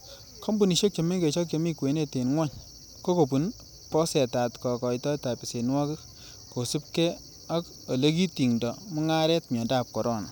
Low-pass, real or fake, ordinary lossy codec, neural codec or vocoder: none; real; none; none